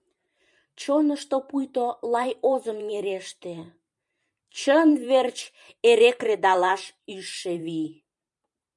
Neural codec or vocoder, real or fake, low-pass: vocoder, 44.1 kHz, 128 mel bands every 512 samples, BigVGAN v2; fake; 10.8 kHz